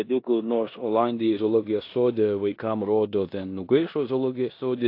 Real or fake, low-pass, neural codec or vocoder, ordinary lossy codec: fake; 5.4 kHz; codec, 16 kHz in and 24 kHz out, 0.9 kbps, LongCat-Audio-Codec, four codebook decoder; AAC, 32 kbps